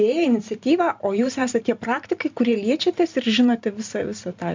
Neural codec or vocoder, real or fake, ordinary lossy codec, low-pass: vocoder, 44.1 kHz, 128 mel bands every 512 samples, BigVGAN v2; fake; MP3, 64 kbps; 7.2 kHz